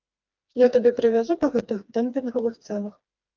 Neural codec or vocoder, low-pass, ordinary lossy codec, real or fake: codec, 16 kHz, 2 kbps, FreqCodec, smaller model; 7.2 kHz; Opus, 24 kbps; fake